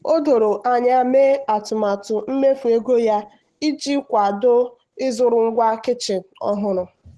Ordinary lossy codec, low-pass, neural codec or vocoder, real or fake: Opus, 16 kbps; 10.8 kHz; none; real